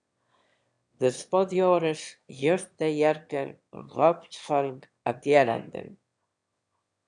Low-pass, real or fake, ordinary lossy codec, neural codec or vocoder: 9.9 kHz; fake; MP3, 96 kbps; autoencoder, 22.05 kHz, a latent of 192 numbers a frame, VITS, trained on one speaker